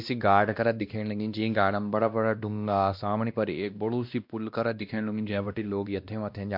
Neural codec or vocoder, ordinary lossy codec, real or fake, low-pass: codec, 16 kHz, 1 kbps, X-Codec, WavLM features, trained on Multilingual LibriSpeech; MP3, 48 kbps; fake; 5.4 kHz